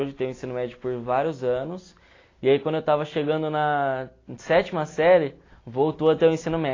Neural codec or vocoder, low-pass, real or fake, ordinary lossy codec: none; 7.2 kHz; real; AAC, 32 kbps